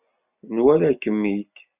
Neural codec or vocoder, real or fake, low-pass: none; real; 3.6 kHz